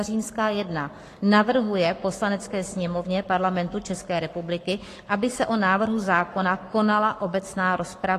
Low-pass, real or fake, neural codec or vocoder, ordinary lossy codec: 14.4 kHz; fake; codec, 44.1 kHz, 7.8 kbps, Pupu-Codec; AAC, 48 kbps